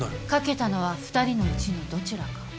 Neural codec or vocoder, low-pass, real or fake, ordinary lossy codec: none; none; real; none